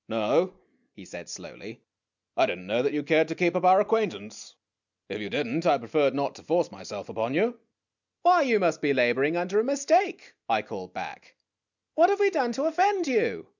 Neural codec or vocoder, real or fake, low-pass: none; real; 7.2 kHz